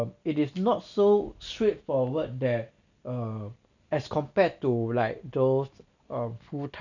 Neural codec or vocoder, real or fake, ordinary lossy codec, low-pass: none; real; none; 7.2 kHz